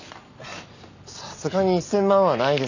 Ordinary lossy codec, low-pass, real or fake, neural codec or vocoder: none; 7.2 kHz; real; none